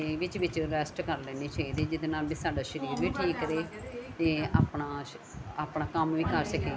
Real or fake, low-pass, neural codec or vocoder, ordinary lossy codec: real; none; none; none